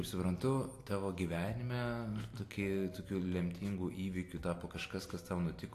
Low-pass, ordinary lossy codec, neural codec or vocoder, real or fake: 14.4 kHz; AAC, 64 kbps; none; real